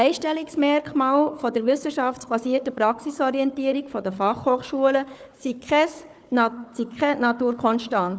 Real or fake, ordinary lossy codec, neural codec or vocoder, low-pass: fake; none; codec, 16 kHz, 4 kbps, FunCodec, trained on Chinese and English, 50 frames a second; none